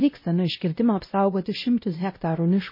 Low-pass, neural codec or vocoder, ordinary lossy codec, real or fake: 5.4 kHz; codec, 16 kHz, 0.5 kbps, X-Codec, WavLM features, trained on Multilingual LibriSpeech; MP3, 24 kbps; fake